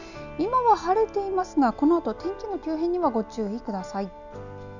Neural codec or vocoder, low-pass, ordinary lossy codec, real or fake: none; 7.2 kHz; none; real